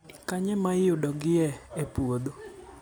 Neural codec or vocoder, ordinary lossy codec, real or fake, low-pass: none; none; real; none